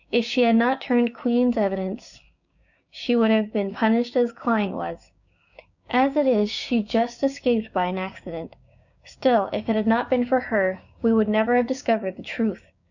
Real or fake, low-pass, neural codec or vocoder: fake; 7.2 kHz; codec, 16 kHz, 6 kbps, DAC